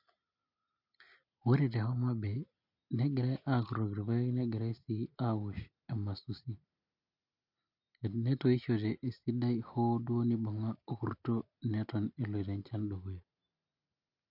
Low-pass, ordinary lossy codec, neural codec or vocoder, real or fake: 5.4 kHz; MP3, 48 kbps; none; real